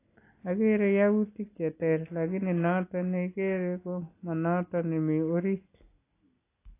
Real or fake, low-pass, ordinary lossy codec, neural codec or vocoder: real; 3.6 kHz; AAC, 24 kbps; none